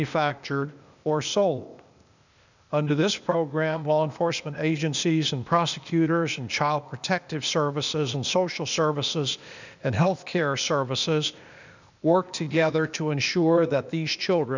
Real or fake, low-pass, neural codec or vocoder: fake; 7.2 kHz; codec, 16 kHz, 0.8 kbps, ZipCodec